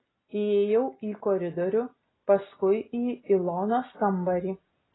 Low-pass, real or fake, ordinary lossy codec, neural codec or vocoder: 7.2 kHz; real; AAC, 16 kbps; none